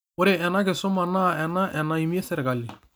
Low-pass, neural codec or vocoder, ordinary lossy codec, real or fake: none; none; none; real